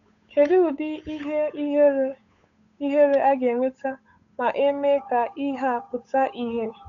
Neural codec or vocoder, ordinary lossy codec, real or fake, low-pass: codec, 16 kHz, 8 kbps, FunCodec, trained on Chinese and English, 25 frames a second; none; fake; 7.2 kHz